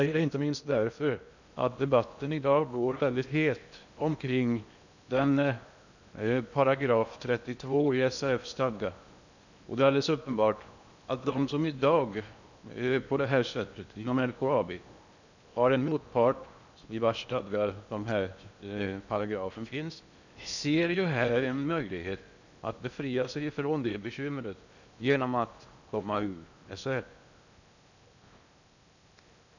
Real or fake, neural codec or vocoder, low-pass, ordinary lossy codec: fake; codec, 16 kHz in and 24 kHz out, 0.8 kbps, FocalCodec, streaming, 65536 codes; 7.2 kHz; none